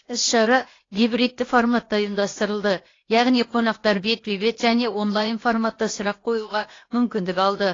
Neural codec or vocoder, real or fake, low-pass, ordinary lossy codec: codec, 16 kHz, 0.8 kbps, ZipCodec; fake; 7.2 kHz; AAC, 32 kbps